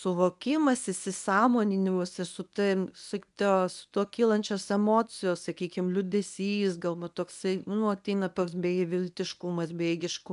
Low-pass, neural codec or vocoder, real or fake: 10.8 kHz; codec, 24 kHz, 0.9 kbps, WavTokenizer, medium speech release version 1; fake